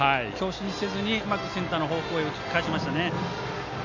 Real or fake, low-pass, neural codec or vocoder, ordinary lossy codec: real; 7.2 kHz; none; none